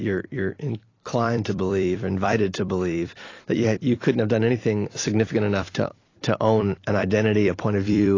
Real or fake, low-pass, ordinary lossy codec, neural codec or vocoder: fake; 7.2 kHz; AAC, 32 kbps; vocoder, 44.1 kHz, 128 mel bands every 256 samples, BigVGAN v2